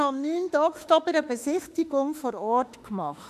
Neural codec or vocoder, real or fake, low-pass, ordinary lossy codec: autoencoder, 48 kHz, 32 numbers a frame, DAC-VAE, trained on Japanese speech; fake; 14.4 kHz; none